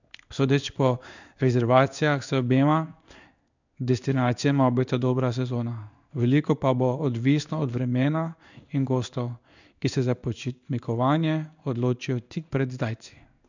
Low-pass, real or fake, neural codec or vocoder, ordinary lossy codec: 7.2 kHz; fake; codec, 16 kHz in and 24 kHz out, 1 kbps, XY-Tokenizer; none